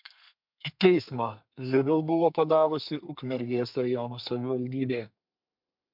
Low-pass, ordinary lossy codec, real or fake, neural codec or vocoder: 5.4 kHz; MP3, 48 kbps; fake; codec, 32 kHz, 1.9 kbps, SNAC